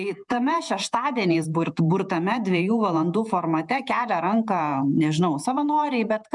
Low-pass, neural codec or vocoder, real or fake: 10.8 kHz; vocoder, 48 kHz, 128 mel bands, Vocos; fake